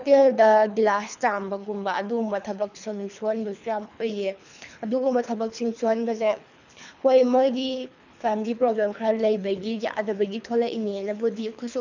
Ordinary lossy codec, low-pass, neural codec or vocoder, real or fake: none; 7.2 kHz; codec, 24 kHz, 3 kbps, HILCodec; fake